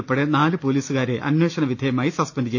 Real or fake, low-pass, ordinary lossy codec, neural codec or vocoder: real; 7.2 kHz; none; none